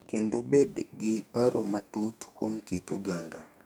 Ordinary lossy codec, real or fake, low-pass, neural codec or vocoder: none; fake; none; codec, 44.1 kHz, 2.6 kbps, DAC